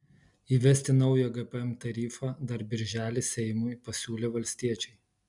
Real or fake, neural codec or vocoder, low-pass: real; none; 10.8 kHz